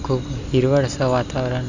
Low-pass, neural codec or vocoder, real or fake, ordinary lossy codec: 7.2 kHz; none; real; Opus, 64 kbps